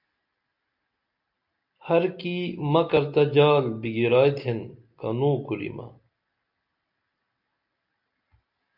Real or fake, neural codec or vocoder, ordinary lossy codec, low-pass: real; none; MP3, 48 kbps; 5.4 kHz